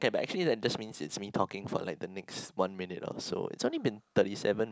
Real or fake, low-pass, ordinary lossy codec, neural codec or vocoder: real; none; none; none